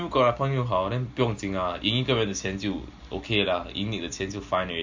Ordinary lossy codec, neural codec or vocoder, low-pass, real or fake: MP3, 64 kbps; none; 7.2 kHz; real